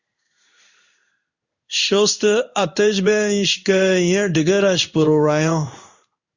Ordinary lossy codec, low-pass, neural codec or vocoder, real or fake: Opus, 64 kbps; 7.2 kHz; codec, 16 kHz in and 24 kHz out, 1 kbps, XY-Tokenizer; fake